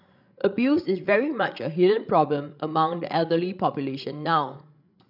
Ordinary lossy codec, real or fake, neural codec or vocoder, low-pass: none; fake; codec, 16 kHz, 16 kbps, FreqCodec, larger model; 5.4 kHz